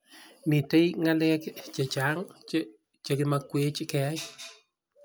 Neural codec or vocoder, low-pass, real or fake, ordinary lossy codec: none; none; real; none